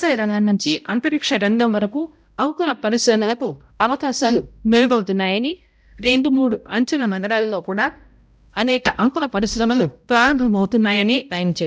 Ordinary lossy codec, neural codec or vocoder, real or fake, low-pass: none; codec, 16 kHz, 0.5 kbps, X-Codec, HuBERT features, trained on balanced general audio; fake; none